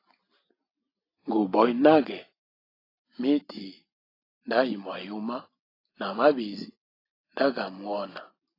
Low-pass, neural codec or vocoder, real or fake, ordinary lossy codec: 5.4 kHz; none; real; AAC, 24 kbps